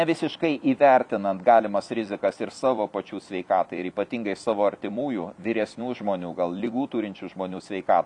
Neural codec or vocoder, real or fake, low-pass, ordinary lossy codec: vocoder, 44.1 kHz, 128 mel bands every 256 samples, BigVGAN v2; fake; 10.8 kHz; MP3, 96 kbps